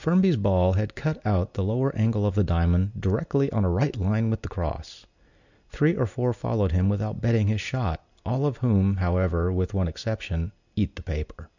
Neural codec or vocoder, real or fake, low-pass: none; real; 7.2 kHz